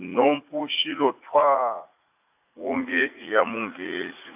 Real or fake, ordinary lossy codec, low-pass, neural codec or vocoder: fake; AAC, 32 kbps; 3.6 kHz; vocoder, 44.1 kHz, 80 mel bands, Vocos